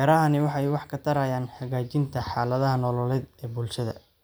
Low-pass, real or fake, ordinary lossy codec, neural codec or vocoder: none; real; none; none